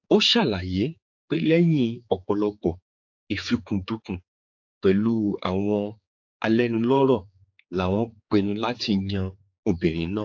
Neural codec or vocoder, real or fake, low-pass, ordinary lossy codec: codec, 16 kHz, 4 kbps, X-Codec, HuBERT features, trained on general audio; fake; 7.2 kHz; AAC, 48 kbps